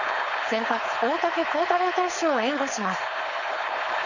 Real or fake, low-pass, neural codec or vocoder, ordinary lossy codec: fake; 7.2 kHz; codec, 16 kHz, 4.8 kbps, FACodec; none